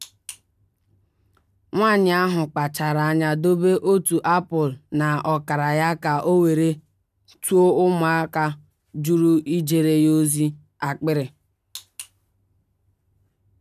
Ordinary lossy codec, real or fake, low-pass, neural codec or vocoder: none; real; 14.4 kHz; none